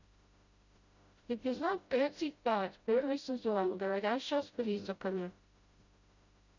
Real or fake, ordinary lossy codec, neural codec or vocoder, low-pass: fake; Opus, 64 kbps; codec, 16 kHz, 0.5 kbps, FreqCodec, smaller model; 7.2 kHz